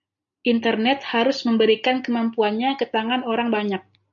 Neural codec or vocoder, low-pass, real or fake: none; 7.2 kHz; real